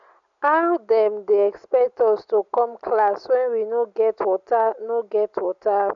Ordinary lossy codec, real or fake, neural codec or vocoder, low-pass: none; real; none; 7.2 kHz